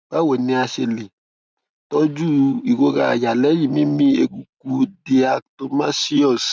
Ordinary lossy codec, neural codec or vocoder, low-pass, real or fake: none; none; none; real